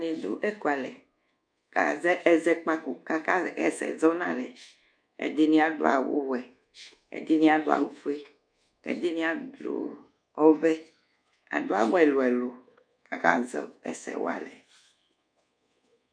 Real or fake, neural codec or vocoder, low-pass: fake; codec, 24 kHz, 1.2 kbps, DualCodec; 9.9 kHz